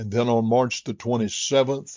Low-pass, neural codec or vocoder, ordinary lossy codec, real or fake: 7.2 kHz; none; MP3, 64 kbps; real